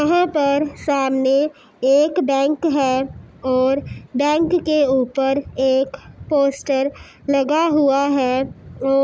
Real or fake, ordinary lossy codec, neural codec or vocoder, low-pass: real; none; none; none